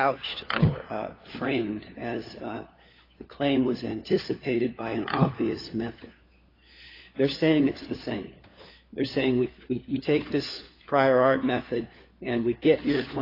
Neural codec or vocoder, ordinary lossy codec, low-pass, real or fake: codec, 16 kHz, 4 kbps, FunCodec, trained on LibriTTS, 50 frames a second; AAC, 24 kbps; 5.4 kHz; fake